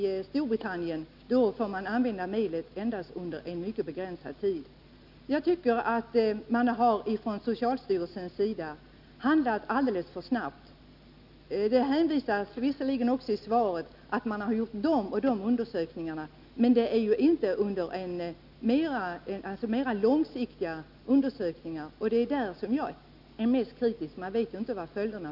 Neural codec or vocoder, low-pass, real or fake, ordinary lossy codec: none; 5.4 kHz; real; none